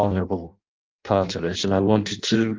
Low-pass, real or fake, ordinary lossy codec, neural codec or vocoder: 7.2 kHz; fake; Opus, 32 kbps; codec, 16 kHz in and 24 kHz out, 0.6 kbps, FireRedTTS-2 codec